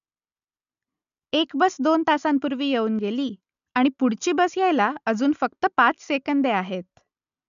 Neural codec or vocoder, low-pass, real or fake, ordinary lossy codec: none; 7.2 kHz; real; none